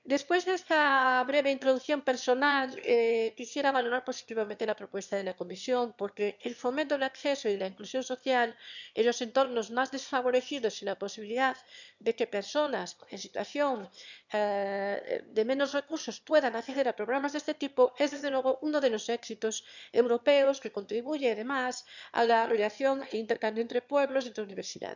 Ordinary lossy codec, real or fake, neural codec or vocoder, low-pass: none; fake; autoencoder, 22.05 kHz, a latent of 192 numbers a frame, VITS, trained on one speaker; 7.2 kHz